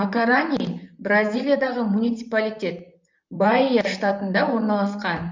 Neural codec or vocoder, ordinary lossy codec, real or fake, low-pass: vocoder, 44.1 kHz, 128 mel bands, Pupu-Vocoder; MP3, 64 kbps; fake; 7.2 kHz